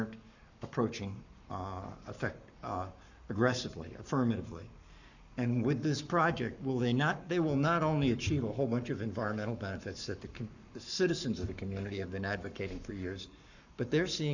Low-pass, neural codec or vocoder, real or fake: 7.2 kHz; codec, 44.1 kHz, 7.8 kbps, Pupu-Codec; fake